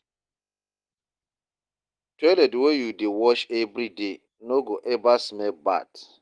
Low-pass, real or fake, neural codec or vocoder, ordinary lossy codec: 9.9 kHz; real; none; Opus, 24 kbps